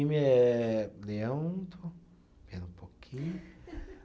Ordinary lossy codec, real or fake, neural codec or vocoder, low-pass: none; real; none; none